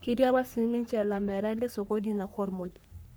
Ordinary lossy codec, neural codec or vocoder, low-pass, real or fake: none; codec, 44.1 kHz, 3.4 kbps, Pupu-Codec; none; fake